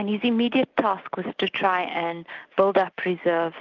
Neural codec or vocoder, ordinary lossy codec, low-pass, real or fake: none; Opus, 32 kbps; 7.2 kHz; real